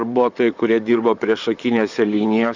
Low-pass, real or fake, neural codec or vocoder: 7.2 kHz; fake; vocoder, 44.1 kHz, 128 mel bands, Pupu-Vocoder